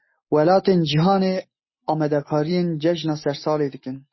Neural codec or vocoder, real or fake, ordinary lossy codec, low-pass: none; real; MP3, 24 kbps; 7.2 kHz